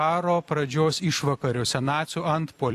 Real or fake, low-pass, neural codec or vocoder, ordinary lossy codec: fake; 14.4 kHz; vocoder, 44.1 kHz, 128 mel bands every 256 samples, BigVGAN v2; AAC, 64 kbps